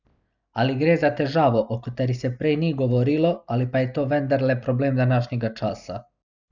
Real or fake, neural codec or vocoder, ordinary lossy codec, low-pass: real; none; none; 7.2 kHz